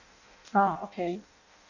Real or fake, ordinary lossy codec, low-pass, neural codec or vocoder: fake; none; 7.2 kHz; codec, 16 kHz in and 24 kHz out, 0.6 kbps, FireRedTTS-2 codec